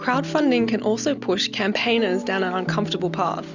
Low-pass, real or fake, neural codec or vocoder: 7.2 kHz; real; none